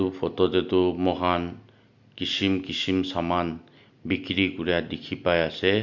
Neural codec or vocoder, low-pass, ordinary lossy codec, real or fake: none; 7.2 kHz; Opus, 64 kbps; real